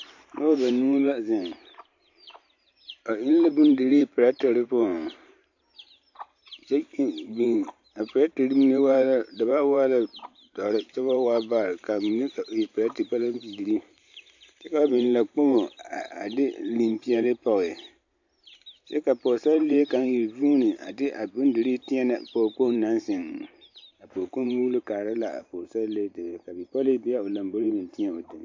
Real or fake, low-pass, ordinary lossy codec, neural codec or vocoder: fake; 7.2 kHz; AAC, 48 kbps; vocoder, 44.1 kHz, 128 mel bands every 512 samples, BigVGAN v2